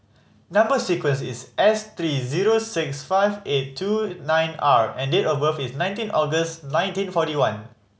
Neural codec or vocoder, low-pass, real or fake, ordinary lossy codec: none; none; real; none